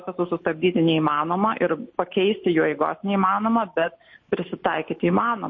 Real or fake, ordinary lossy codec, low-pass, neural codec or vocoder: real; MP3, 32 kbps; 7.2 kHz; none